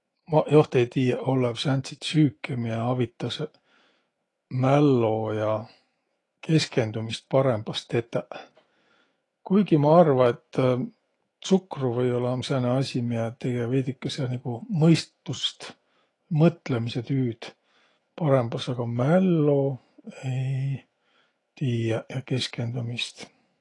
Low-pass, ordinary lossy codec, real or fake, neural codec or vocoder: 10.8 kHz; AAC, 32 kbps; fake; autoencoder, 48 kHz, 128 numbers a frame, DAC-VAE, trained on Japanese speech